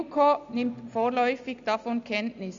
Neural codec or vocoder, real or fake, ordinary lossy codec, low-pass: none; real; Opus, 64 kbps; 7.2 kHz